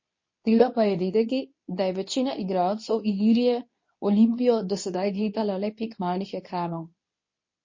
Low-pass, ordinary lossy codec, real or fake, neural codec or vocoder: 7.2 kHz; MP3, 32 kbps; fake; codec, 24 kHz, 0.9 kbps, WavTokenizer, medium speech release version 2